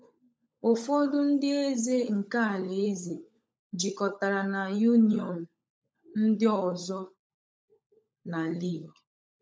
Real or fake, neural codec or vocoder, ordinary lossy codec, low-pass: fake; codec, 16 kHz, 16 kbps, FunCodec, trained on LibriTTS, 50 frames a second; none; none